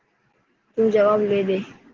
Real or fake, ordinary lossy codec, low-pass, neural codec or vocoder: real; Opus, 16 kbps; 7.2 kHz; none